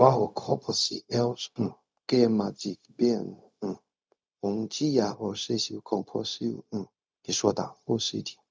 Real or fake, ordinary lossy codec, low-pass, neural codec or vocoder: fake; none; none; codec, 16 kHz, 0.4 kbps, LongCat-Audio-Codec